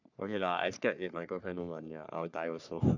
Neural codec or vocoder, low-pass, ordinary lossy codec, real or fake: codec, 44.1 kHz, 3.4 kbps, Pupu-Codec; 7.2 kHz; none; fake